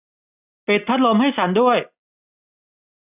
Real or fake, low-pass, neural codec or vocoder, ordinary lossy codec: real; 3.6 kHz; none; none